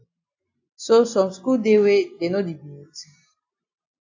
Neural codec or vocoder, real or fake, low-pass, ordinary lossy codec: none; real; 7.2 kHz; AAC, 48 kbps